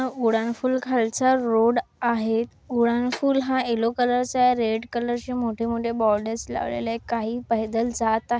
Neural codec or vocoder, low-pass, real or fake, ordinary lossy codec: none; none; real; none